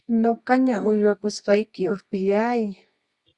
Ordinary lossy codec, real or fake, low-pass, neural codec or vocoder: Opus, 64 kbps; fake; 10.8 kHz; codec, 24 kHz, 0.9 kbps, WavTokenizer, medium music audio release